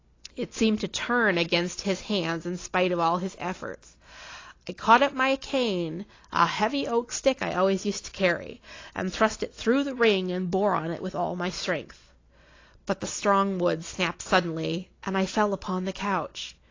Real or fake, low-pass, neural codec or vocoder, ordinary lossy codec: real; 7.2 kHz; none; AAC, 32 kbps